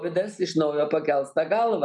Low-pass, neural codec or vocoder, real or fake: 10.8 kHz; none; real